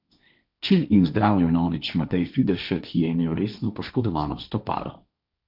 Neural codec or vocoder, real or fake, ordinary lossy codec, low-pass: codec, 16 kHz, 1.1 kbps, Voila-Tokenizer; fake; none; 5.4 kHz